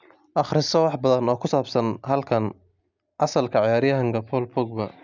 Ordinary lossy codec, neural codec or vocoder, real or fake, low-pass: none; none; real; 7.2 kHz